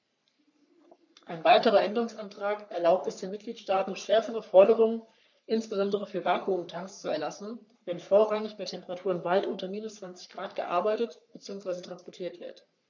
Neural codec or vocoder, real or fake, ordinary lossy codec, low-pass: codec, 44.1 kHz, 3.4 kbps, Pupu-Codec; fake; none; 7.2 kHz